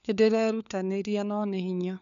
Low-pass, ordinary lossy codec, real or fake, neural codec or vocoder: 7.2 kHz; none; fake; codec, 16 kHz, 4 kbps, FunCodec, trained on LibriTTS, 50 frames a second